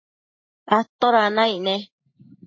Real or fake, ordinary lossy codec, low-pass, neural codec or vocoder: fake; MP3, 32 kbps; 7.2 kHz; vocoder, 44.1 kHz, 128 mel bands every 512 samples, BigVGAN v2